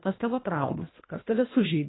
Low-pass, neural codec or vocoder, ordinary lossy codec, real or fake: 7.2 kHz; codec, 24 kHz, 1.5 kbps, HILCodec; AAC, 16 kbps; fake